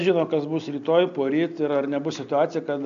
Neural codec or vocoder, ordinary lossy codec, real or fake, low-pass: none; AAC, 64 kbps; real; 7.2 kHz